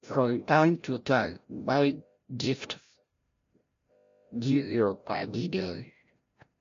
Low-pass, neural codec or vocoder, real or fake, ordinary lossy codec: 7.2 kHz; codec, 16 kHz, 0.5 kbps, FreqCodec, larger model; fake; MP3, 48 kbps